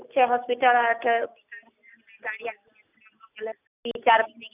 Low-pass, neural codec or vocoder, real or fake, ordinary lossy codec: 3.6 kHz; none; real; none